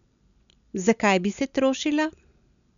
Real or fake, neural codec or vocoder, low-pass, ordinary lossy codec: real; none; 7.2 kHz; none